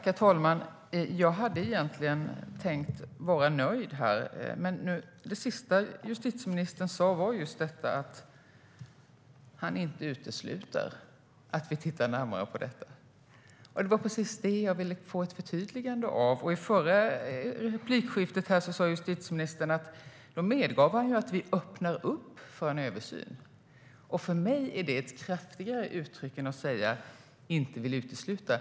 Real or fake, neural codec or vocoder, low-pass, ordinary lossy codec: real; none; none; none